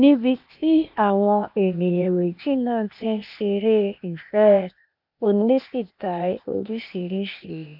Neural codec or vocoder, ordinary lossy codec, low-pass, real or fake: codec, 16 kHz, 0.8 kbps, ZipCodec; none; 5.4 kHz; fake